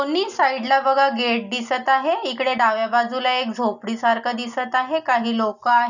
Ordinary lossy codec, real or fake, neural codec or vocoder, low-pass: none; real; none; 7.2 kHz